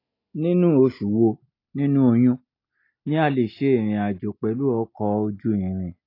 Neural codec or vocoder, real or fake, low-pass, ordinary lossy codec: none; real; 5.4 kHz; AAC, 32 kbps